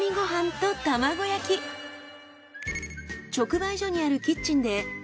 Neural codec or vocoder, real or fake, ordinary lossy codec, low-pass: none; real; none; none